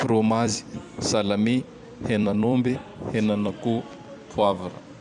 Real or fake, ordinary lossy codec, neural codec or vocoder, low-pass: fake; none; vocoder, 44.1 kHz, 128 mel bands every 256 samples, BigVGAN v2; 10.8 kHz